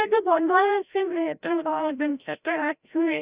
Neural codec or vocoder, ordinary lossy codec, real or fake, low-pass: codec, 16 kHz, 0.5 kbps, FreqCodec, larger model; Opus, 64 kbps; fake; 3.6 kHz